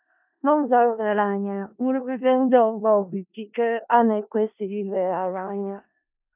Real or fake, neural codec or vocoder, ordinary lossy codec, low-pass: fake; codec, 16 kHz in and 24 kHz out, 0.4 kbps, LongCat-Audio-Codec, four codebook decoder; none; 3.6 kHz